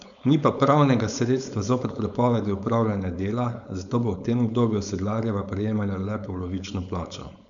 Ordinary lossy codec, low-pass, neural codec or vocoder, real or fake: none; 7.2 kHz; codec, 16 kHz, 4.8 kbps, FACodec; fake